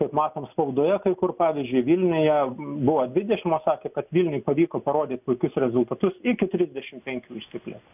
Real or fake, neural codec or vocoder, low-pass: real; none; 3.6 kHz